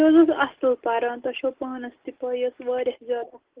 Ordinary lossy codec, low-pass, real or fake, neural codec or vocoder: Opus, 16 kbps; 3.6 kHz; real; none